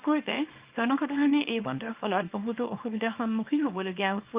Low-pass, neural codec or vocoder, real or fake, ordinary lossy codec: 3.6 kHz; codec, 24 kHz, 0.9 kbps, WavTokenizer, small release; fake; Opus, 32 kbps